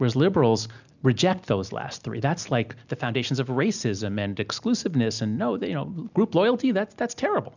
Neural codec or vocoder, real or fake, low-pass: none; real; 7.2 kHz